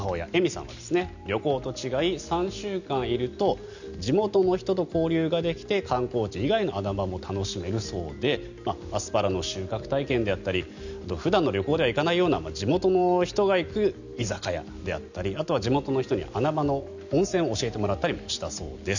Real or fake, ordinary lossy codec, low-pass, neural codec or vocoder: real; none; 7.2 kHz; none